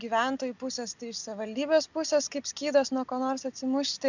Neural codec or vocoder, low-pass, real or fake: none; 7.2 kHz; real